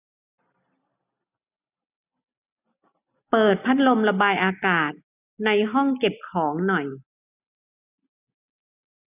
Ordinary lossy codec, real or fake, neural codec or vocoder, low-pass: none; real; none; 3.6 kHz